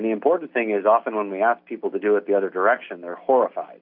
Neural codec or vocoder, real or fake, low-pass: none; real; 5.4 kHz